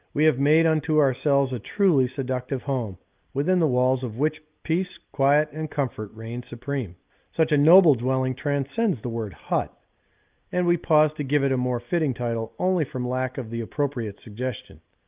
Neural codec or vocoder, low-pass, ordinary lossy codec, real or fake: none; 3.6 kHz; Opus, 24 kbps; real